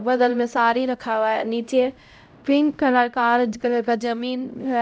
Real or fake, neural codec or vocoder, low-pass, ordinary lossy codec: fake; codec, 16 kHz, 0.5 kbps, X-Codec, HuBERT features, trained on LibriSpeech; none; none